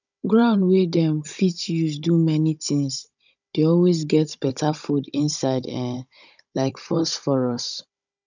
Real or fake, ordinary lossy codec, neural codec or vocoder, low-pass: fake; none; codec, 16 kHz, 16 kbps, FunCodec, trained on Chinese and English, 50 frames a second; 7.2 kHz